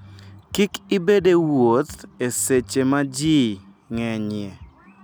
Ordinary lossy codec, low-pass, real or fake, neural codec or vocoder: none; none; real; none